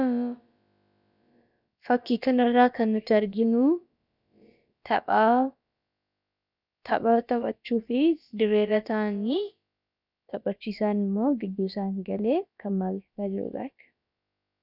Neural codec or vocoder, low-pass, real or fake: codec, 16 kHz, about 1 kbps, DyCAST, with the encoder's durations; 5.4 kHz; fake